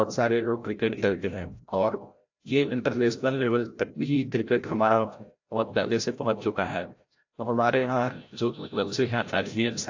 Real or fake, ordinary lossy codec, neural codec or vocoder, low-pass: fake; AAC, 48 kbps; codec, 16 kHz, 0.5 kbps, FreqCodec, larger model; 7.2 kHz